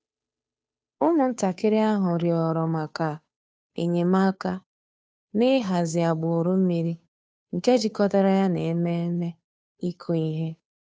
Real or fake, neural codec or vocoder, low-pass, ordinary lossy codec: fake; codec, 16 kHz, 2 kbps, FunCodec, trained on Chinese and English, 25 frames a second; none; none